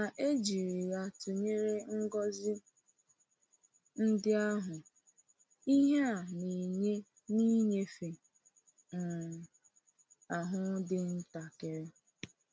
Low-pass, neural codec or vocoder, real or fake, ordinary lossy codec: none; none; real; none